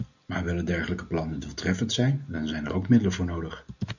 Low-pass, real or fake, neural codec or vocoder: 7.2 kHz; real; none